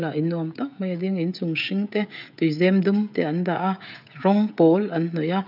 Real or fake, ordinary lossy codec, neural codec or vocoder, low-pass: fake; none; codec, 16 kHz, 16 kbps, FreqCodec, smaller model; 5.4 kHz